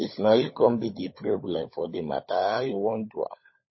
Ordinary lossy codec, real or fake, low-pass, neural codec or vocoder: MP3, 24 kbps; fake; 7.2 kHz; codec, 16 kHz, 16 kbps, FunCodec, trained on LibriTTS, 50 frames a second